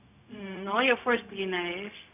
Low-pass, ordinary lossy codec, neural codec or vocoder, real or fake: 3.6 kHz; none; codec, 16 kHz, 0.4 kbps, LongCat-Audio-Codec; fake